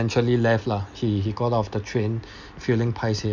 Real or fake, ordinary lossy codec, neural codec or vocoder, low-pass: real; none; none; 7.2 kHz